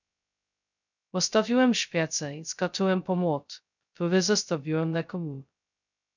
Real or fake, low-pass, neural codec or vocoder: fake; 7.2 kHz; codec, 16 kHz, 0.2 kbps, FocalCodec